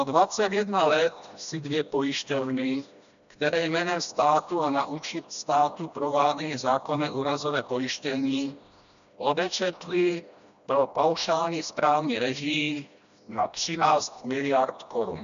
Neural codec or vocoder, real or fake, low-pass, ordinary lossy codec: codec, 16 kHz, 1 kbps, FreqCodec, smaller model; fake; 7.2 kHz; AAC, 96 kbps